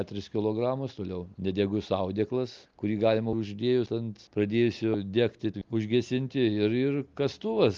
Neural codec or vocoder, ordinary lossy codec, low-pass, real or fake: none; Opus, 32 kbps; 7.2 kHz; real